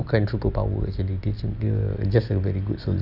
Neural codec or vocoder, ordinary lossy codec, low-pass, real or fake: none; none; 5.4 kHz; real